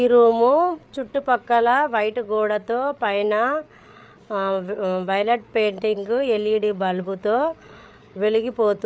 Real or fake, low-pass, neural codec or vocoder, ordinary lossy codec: fake; none; codec, 16 kHz, 8 kbps, FreqCodec, larger model; none